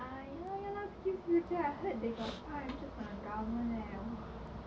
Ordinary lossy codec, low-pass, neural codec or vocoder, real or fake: none; none; none; real